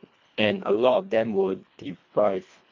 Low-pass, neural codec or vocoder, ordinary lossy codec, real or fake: 7.2 kHz; codec, 24 kHz, 1.5 kbps, HILCodec; MP3, 48 kbps; fake